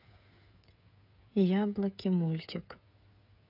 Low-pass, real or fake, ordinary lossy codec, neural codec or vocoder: 5.4 kHz; fake; none; codec, 16 kHz, 8 kbps, FreqCodec, smaller model